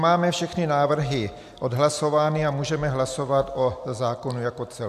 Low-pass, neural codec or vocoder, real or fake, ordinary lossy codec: 14.4 kHz; none; real; AAC, 96 kbps